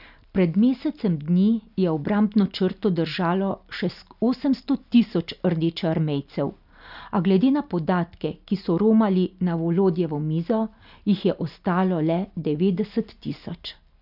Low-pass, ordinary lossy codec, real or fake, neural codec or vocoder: 5.4 kHz; none; real; none